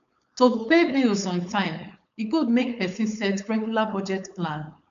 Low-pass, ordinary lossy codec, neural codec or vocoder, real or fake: 7.2 kHz; none; codec, 16 kHz, 4.8 kbps, FACodec; fake